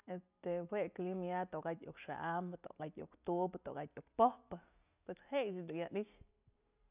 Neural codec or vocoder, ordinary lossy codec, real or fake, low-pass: none; none; real; 3.6 kHz